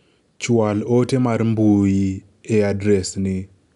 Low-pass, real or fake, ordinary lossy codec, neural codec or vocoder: 10.8 kHz; real; none; none